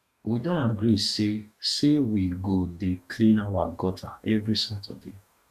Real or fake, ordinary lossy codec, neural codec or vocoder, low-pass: fake; none; codec, 44.1 kHz, 2.6 kbps, DAC; 14.4 kHz